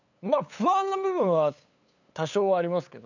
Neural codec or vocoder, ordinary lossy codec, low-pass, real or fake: codec, 16 kHz, 8 kbps, FunCodec, trained on LibriTTS, 25 frames a second; none; 7.2 kHz; fake